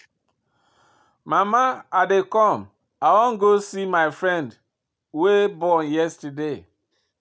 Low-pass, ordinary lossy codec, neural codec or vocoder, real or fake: none; none; none; real